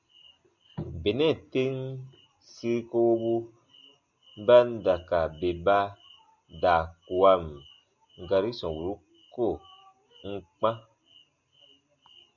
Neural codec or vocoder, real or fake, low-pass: none; real; 7.2 kHz